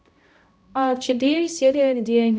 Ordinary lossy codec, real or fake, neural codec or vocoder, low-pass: none; fake; codec, 16 kHz, 0.5 kbps, X-Codec, HuBERT features, trained on balanced general audio; none